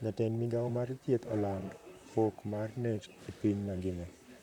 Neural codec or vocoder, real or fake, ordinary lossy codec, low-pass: vocoder, 44.1 kHz, 128 mel bands, Pupu-Vocoder; fake; none; 19.8 kHz